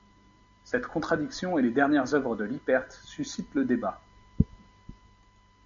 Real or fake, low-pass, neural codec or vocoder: real; 7.2 kHz; none